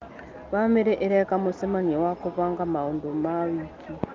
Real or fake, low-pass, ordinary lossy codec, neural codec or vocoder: real; 7.2 kHz; Opus, 32 kbps; none